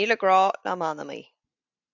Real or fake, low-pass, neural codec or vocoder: real; 7.2 kHz; none